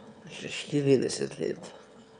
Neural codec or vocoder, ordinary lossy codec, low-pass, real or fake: autoencoder, 22.05 kHz, a latent of 192 numbers a frame, VITS, trained on one speaker; none; 9.9 kHz; fake